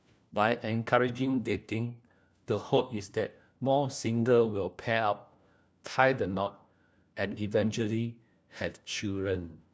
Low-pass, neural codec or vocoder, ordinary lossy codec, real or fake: none; codec, 16 kHz, 1 kbps, FunCodec, trained on LibriTTS, 50 frames a second; none; fake